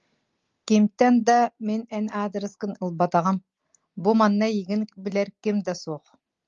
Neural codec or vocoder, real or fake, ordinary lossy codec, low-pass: none; real; Opus, 32 kbps; 7.2 kHz